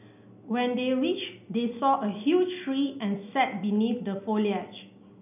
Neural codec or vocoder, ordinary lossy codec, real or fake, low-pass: none; none; real; 3.6 kHz